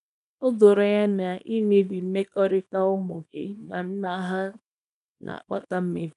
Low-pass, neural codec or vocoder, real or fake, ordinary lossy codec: 10.8 kHz; codec, 24 kHz, 0.9 kbps, WavTokenizer, small release; fake; none